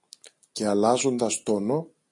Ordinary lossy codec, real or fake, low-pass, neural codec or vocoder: AAC, 48 kbps; real; 10.8 kHz; none